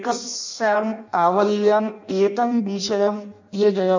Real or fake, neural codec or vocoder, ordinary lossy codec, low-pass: fake; codec, 16 kHz in and 24 kHz out, 0.6 kbps, FireRedTTS-2 codec; MP3, 64 kbps; 7.2 kHz